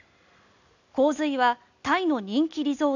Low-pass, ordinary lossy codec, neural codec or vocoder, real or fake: 7.2 kHz; none; none; real